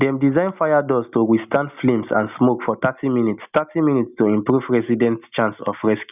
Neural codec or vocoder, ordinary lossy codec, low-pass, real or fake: none; none; 3.6 kHz; real